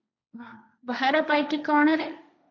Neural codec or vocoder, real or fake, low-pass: codec, 16 kHz, 1.1 kbps, Voila-Tokenizer; fake; 7.2 kHz